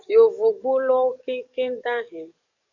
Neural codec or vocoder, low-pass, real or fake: codec, 44.1 kHz, 7.8 kbps, DAC; 7.2 kHz; fake